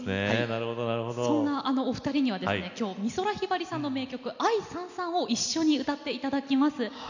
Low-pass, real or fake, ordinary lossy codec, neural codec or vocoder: 7.2 kHz; real; none; none